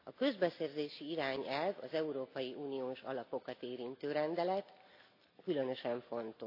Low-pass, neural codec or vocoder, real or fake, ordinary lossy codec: 5.4 kHz; none; real; AAC, 32 kbps